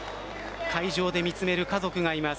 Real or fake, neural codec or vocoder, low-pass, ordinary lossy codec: real; none; none; none